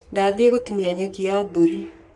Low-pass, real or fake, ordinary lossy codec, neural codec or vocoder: 10.8 kHz; fake; MP3, 96 kbps; codec, 44.1 kHz, 3.4 kbps, Pupu-Codec